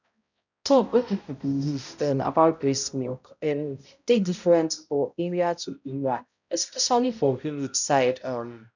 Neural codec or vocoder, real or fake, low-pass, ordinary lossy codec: codec, 16 kHz, 0.5 kbps, X-Codec, HuBERT features, trained on balanced general audio; fake; 7.2 kHz; none